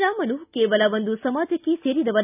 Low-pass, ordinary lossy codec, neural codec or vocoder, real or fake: 3.6 kHz; none; none; real